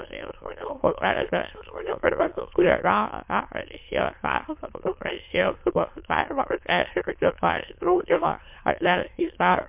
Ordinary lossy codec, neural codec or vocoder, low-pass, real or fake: MP3, 32 kbps; autoencoder, 22.05 kHz, a latent of 192 numbers a frame, VITS, trained on many speakers; 3.6 kHz; fake